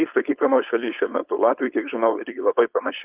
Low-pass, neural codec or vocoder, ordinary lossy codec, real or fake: 3.6 kHz; codec, 16 kHz, 4.8 kbps, FACodec; Opus, 24 kbps; fake